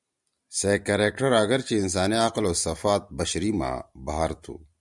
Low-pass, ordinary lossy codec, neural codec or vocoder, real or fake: 10.8 kHz; MP3, 96 kbps; none; real